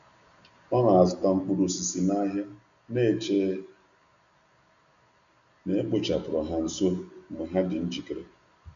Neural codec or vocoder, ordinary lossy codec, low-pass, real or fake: none; none; 7.2 kHz; real